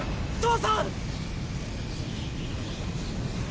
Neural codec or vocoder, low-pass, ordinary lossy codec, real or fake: none; none; none; real